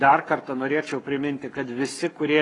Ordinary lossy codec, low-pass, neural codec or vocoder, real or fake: AAC, 32 kbps; 10.8 kHz; codec, 44.1 kHz, 7.8 kbps, Pupu-Codec; fake